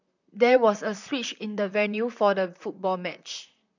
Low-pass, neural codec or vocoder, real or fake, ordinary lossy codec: 7.2 kHz; vocoder, 44.1 kHz, 128 mel bands, Pupu-Vocoder; fake; none